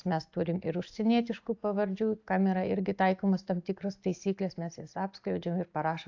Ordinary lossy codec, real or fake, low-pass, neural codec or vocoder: AAC, 48 kbps; real; 7.2 kHz; none